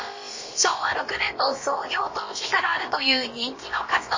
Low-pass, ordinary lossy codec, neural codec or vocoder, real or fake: 7.2 kHz; MP3, 32 kbps; codec, 16 kHz, about 1 kbps, DyCAST, with the encoder's durations; fake